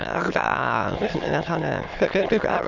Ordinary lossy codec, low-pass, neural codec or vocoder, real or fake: none; 7.2 kHz; autoencoder, 22.05 kHz, a latent of 192 numbers a frame, VITS, trained on many speakers; fake